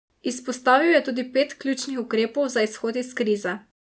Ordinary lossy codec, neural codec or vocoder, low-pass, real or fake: none; none; none; real